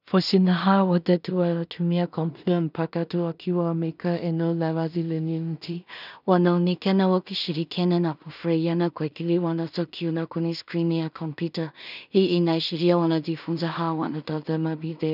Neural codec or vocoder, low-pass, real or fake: codec, 16 kHz in and 24 kHz out, 0.4 kbps, LongCat-Audio-Codec, two codebook decoder; 5.4 kHz; fake